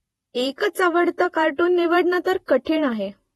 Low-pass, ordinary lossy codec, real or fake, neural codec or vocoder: 19.8 kHz; AAC, 32 kbps; fake; vocoder, 48 kHz, 128 mel bands, Vocos